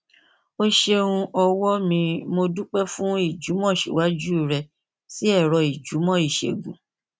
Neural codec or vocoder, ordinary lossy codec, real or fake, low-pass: none; none; real; none